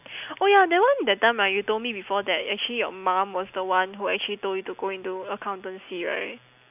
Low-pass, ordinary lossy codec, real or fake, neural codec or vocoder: 3.6 kHz; none; real; none